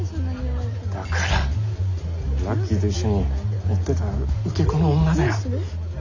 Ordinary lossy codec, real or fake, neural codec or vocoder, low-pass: none; real; none; 7.2 kHz